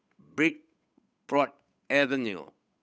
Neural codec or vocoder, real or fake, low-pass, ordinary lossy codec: codec, 16 kHz, 8 kbps, FunCodec, trained on Chinese and English, 25 frames a second; fake; none; none